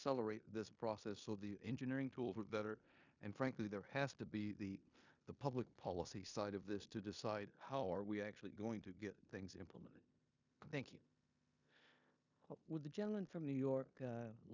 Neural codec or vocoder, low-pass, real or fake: codec, 16 kHz in and 24 kHz out, 0.9 kbps, LongCat-Audio-Codec, four codebook decoder; 7.2 kHz; fake